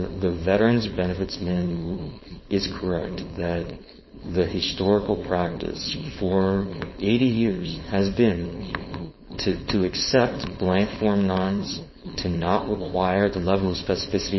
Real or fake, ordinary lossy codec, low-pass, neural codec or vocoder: fake; MP3, 24 kbps; 7.2 kHz; codec, 16 kHz, 4.8 kbps, FACodec